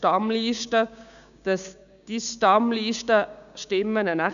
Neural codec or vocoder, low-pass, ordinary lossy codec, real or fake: codec, 16 kHz, 6 kbps, DAC; 7.2 kHz; none; fake